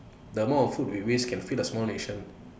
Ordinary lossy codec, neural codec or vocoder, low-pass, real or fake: none; none; none; real